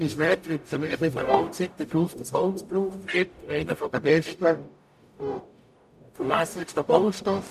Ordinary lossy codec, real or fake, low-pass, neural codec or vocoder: none; fake; 14.4 kHz; codec, 44.1 kHz, 0.9 kbps, DAC